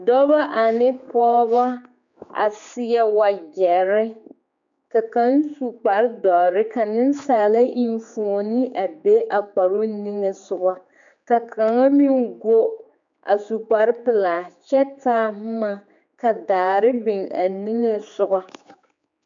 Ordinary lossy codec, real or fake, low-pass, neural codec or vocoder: MP3, 64 kbps; fake; 7.2 kHz; codec, 16 kHz, 4 kbps, X-Codec, HuBERT features, trained on general audio